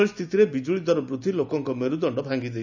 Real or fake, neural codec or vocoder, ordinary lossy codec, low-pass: real; none; none; 7.2 kHz